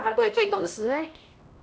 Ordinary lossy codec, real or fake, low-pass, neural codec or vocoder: none; fake; none; codec, 16 kHz, 1 kbps, X-Codec, HuBERT features, trained on LibriSpeech